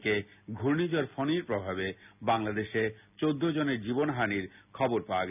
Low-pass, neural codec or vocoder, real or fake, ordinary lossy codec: 3.6 kHz; none; real; none